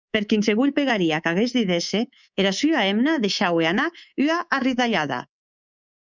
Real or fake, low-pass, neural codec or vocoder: fake; 7.2 kHz; codec, 24 kHz, 3.1 kbps, DualCodec